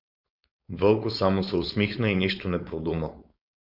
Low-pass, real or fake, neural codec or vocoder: 5.4 kHz; fake; codec, 16 kHz, 4.8 kbps, FACodec